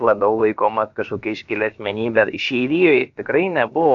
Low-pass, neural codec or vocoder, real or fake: 7.2 kHz; codec, 16 kHz, about 1 kbps, DyCAST, with the encoder's durations; fake